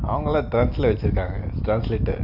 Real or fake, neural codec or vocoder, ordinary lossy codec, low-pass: real; none; none; 5.4 kHz